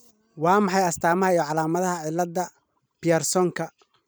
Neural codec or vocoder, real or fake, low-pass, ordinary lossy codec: vocoder, 44.1 kHz, 128 mel bands every 256 samples, BigVGAN v2; fake; none; none